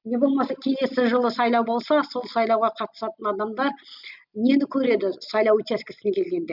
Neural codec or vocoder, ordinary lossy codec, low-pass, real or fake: none; none; 5.4 kHz; real